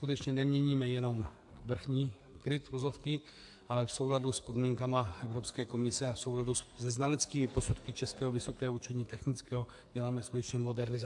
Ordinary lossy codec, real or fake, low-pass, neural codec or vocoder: AAC, 64 kbps; fake; 10.8 kHz; codec, 44.1 kHz, 2.6 kbps, SNAC